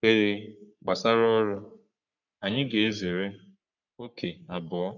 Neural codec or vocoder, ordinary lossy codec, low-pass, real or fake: codec, 44.1 kHz, 3.4 kbps, Pupu-Codec; none; 7.2 kHz; fake